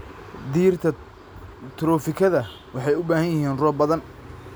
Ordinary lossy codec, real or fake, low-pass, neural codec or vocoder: none; real; none; none